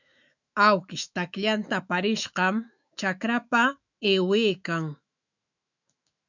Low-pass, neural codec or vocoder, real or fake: 7.2 kHz; autoencoder, 48 kHz, 128 numbers a frame, DAC-VAE, trained on Japanese speech; fake